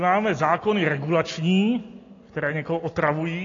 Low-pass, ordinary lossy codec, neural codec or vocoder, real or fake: 7.2 kHz; AAC, 32 kbps; none; real